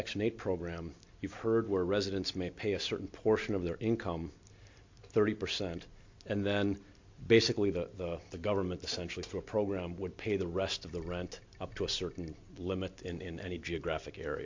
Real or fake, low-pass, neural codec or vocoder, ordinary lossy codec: real; 7.2 kHz; none; MP3, 48 kbps